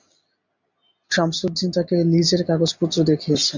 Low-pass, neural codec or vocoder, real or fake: 7.2 kHz; none; real